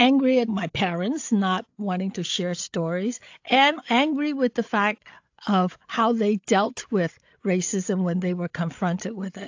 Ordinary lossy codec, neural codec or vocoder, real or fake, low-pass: AAC, 48 kbps; codec, 16 kHz, 8 kbps, FreqCodec, larger model; fake; 7.2 kHz